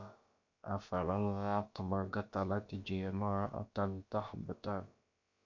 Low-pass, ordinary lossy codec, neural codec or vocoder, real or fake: 7.2 kHz; MP3, 48 kbps; codec, 16 kHz, about 1 kbps, DyCAST, with the encoder's durations; fake